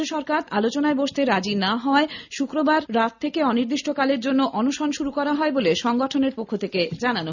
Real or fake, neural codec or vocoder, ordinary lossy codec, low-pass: real; none; none; 7.2 kHz